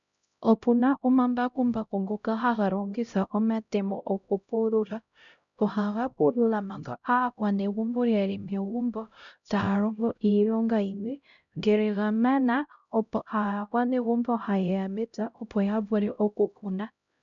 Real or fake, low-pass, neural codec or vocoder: fake; 7.2 kHz; codec, 16 kHz, 0.5 kbps, X-Codec, HuBERT features, trained on LibriSpeech